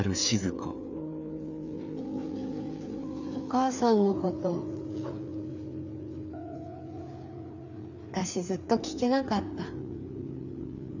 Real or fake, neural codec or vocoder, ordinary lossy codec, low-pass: fake; codec, 16 kHz, 4 kbps, FreqCodec, larger model; none; 7.2 kHz